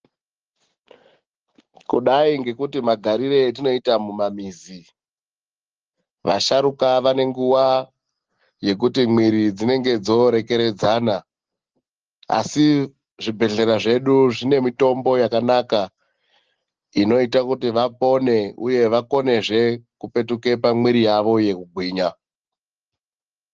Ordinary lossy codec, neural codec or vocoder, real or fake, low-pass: Opus, 16 kbps; none; real; 7.2 kHz